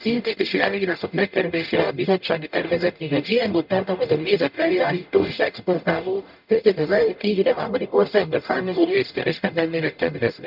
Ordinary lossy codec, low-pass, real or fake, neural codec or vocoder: none; 5.4 kHz; fake; codec, 44.1 kHz, 0.9 kbps, DAC